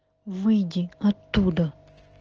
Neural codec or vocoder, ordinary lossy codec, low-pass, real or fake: none; Opus, 16 kbps; 7.2 kHz; real